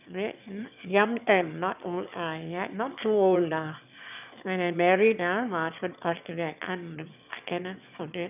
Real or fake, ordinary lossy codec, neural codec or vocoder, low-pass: fake; none; autoencoder, 22.05 kHz, a latent of 192 numbers a frame, VITS, trained on one speaker; 3.6 kHz